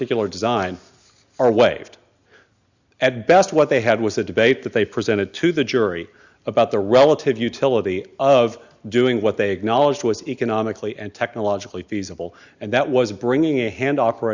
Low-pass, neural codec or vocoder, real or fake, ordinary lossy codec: 7.2 kHz; none; real; Opus, 64 kbps